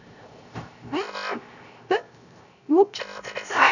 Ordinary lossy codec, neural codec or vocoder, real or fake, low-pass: none; codec, 16 kHz, 0.3 kbps, FocalCodec; fake; 7.2 kHz